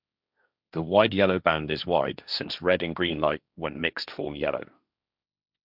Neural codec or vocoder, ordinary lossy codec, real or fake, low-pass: codec, 16 kHz, 1.1 kbps, Voila-Tokenizer; none; fake; 5.4 kHz